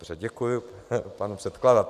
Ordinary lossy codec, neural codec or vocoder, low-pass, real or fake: AAC, 96 kbps; vocoder, 44.1 kHz, 128 mel bands every 512 samples, BigVGAN v2; 14.4 kHz; fake